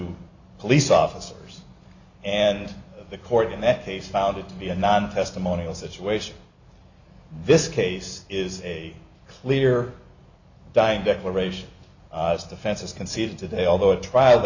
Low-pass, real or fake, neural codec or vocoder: 7.2 kHz; real; none